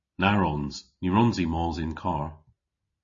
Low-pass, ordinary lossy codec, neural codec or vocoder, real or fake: 7.2 kHz; MP3, 32 kbps; none; real